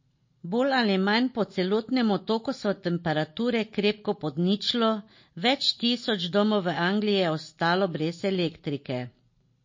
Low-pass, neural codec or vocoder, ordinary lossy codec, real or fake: 7.2 kHz; none; MP3, 32 kbps; real